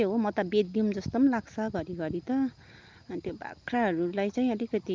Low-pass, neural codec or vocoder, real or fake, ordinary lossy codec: 7.2 kHz; none; real; Opus, 32 kbps